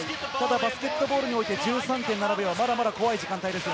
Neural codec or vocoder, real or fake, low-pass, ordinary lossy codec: none; real; none; none